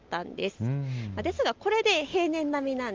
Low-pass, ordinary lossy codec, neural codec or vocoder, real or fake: 7.2 kHz; Opus, 24 kbps; none; real